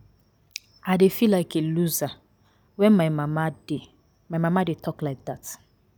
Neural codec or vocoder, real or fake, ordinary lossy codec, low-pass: none; real; none; none